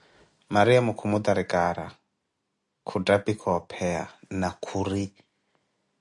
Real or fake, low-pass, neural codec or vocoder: real; 10.8 kHz; none